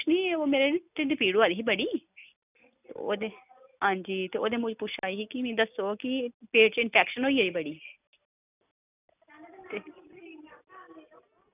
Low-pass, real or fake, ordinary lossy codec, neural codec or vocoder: 3.6 kHz; real; none; none